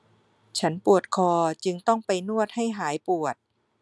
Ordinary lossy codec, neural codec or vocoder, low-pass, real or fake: none; none; none; real